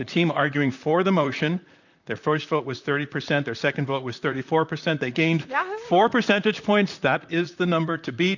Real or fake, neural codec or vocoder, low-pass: fake; vocoder, 44.1 kHz, 128 mel bands, Pupu-Vocoder; 7.2 kHz